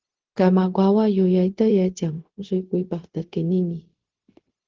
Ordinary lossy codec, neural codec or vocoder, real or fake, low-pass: Opus, 16 kbps; codec, 16 kHz, 0.4 kbps, LongCat-Audio-Codec; fake; 7.2 kHz